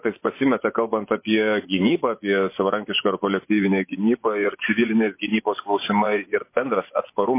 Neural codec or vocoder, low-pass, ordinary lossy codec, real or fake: vocoder, 24 kHz, 100 mel bands, Vocos; 3.6 kHz; MP3, 24 kbps; fake